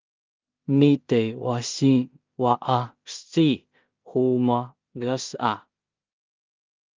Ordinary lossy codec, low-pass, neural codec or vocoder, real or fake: Opus, 32 kbps; 7.2 kHz; codec, 16 kHz in and 24 kHz out, 0.4 kbps, LongCat-Audio-Codec, two codebook decoder; fake